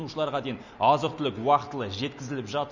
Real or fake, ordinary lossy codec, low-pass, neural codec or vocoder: real; none; 7.2 kHz; none